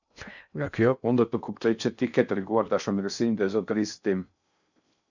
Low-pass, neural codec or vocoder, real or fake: 7.2 kHz; codec, 16 kHz in and 24 kHz out, 0.6 kbps, FocalCodec, streaming, 2048 codes; fake